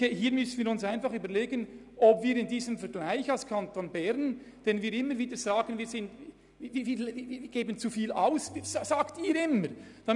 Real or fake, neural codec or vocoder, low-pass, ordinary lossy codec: real; none; 10.8 kHz; none